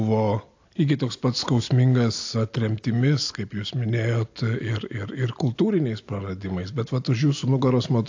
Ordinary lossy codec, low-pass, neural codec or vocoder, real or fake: AAC, 48 kbps; 7.2 kHz; none; real